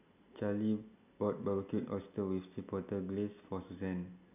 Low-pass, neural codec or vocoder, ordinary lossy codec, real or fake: 3.6 kHz; none; none; real